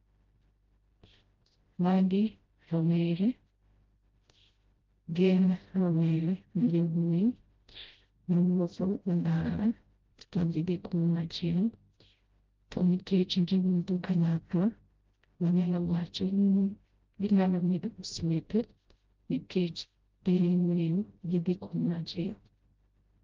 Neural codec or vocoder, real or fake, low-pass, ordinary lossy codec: codec, 16 kHz, 0.5 kbps, FreqCodec, smaller model; fake; 7.2 kHz; Opus, 32 kbps